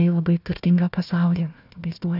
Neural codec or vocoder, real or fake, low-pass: codec, 16 kHz, 1 kbps, FunCodec, trained on Chinese and English, 50 frames a second; fake; 5.4 kHz